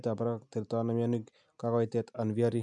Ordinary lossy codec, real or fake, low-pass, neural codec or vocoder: none; real; 9.9 kHz; none